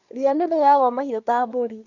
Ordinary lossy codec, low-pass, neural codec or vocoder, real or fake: none; 7.2 kHz; codec, 24 kHz, 1 kbps, SNAC; fake